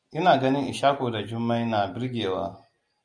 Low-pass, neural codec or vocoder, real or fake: 9.9 kHz; none; real